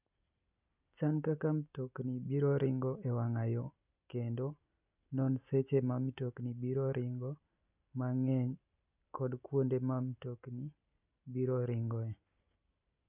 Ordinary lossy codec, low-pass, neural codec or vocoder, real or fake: none; 3.6 kHz; none; real